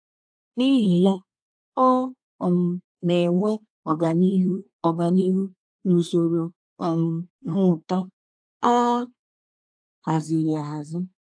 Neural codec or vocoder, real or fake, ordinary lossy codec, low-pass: codec, 24 kHz, 1 kbps, SNAC; fake; AAC, 64 kbps; 9.9 kHz